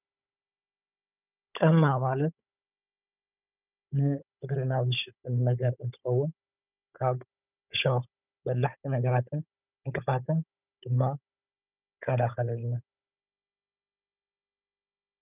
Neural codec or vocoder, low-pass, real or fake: codec, 16 kHz, 16 kbps, FunCodec, trained on Chinese and English, 50 frames a second; 3.6 kHz; fake